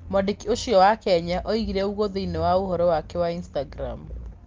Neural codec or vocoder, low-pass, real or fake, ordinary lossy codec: none; 7.2 kHz; real; Opus, 32 kbps